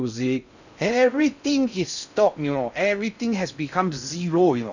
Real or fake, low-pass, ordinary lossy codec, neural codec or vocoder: fake; 7.2 kHz; none; codec, 16 kHz in and 24 kHz out, 0.6 kbps, FocalCodec, streaming, 2048 codes